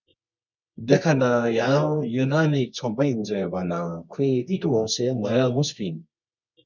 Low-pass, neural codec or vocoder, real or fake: 7.2 kHz; codec, 24 kHz, 0.9 kbps, WavTokenizer, medium music audio release; fake